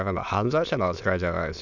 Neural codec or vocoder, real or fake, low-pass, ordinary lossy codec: autoencoder, 22.05 kHz, a latent of 192 numbers a frame, VITS, trained on many speakers; fake; 7.2 kHz; none